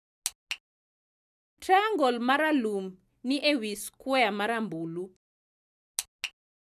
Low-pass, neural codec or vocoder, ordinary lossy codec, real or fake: 14.4 kHz; none; none; real